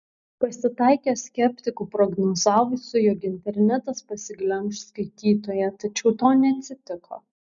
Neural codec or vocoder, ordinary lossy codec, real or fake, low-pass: none; MP3, 96 kbps; real; 7.2 kHz